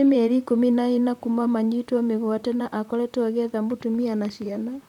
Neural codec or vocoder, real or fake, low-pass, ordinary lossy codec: vocoder, 44.1 kHz, 128 mel bands, Pupu-Vocoder; fake; 19.8 kHz; none